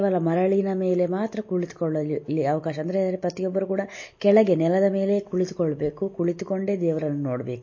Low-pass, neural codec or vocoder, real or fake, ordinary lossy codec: 7.2 kHz; none; real; MP3, 32 kbps